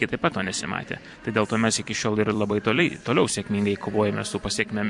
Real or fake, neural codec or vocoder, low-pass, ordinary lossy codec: fake; vocoder, 44.1 kHz, 128 mel bands every 256 samples, BigVGAN v2; 10.8 kHz; MP3, 64 kbps